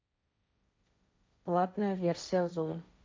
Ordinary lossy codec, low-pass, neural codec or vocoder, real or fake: none; none; codec, 16 kHz, 1.1 kbps, Voila-Tokenizer; fake